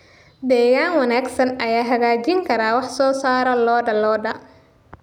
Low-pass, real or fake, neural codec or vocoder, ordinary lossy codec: 19.8 kHz; real; none; none